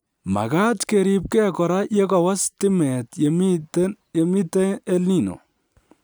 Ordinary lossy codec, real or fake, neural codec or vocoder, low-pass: none; real; none; none